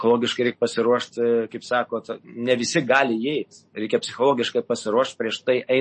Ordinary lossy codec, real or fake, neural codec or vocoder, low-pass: MP3, 32 kbps; real; none; 10.8 kHz